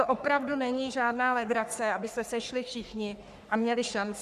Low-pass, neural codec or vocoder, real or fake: 14.4 kHz; codec, 44.1 kHz, 3.4 kbps, Pupu-Codec; fake